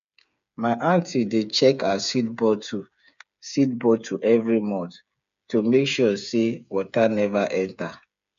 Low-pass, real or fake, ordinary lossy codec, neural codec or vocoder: 7.2 kHz; fake; none; codec, 16 kHz, 8 kbps, FreqCodec, smaller model